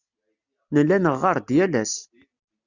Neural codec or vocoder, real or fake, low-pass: none; real; 7.2 kHz